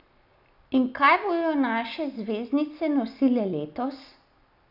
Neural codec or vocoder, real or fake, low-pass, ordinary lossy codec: none; real; 5.4 kHz; none